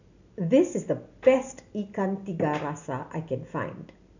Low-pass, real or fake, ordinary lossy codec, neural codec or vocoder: 7.2 kHz; real; none; none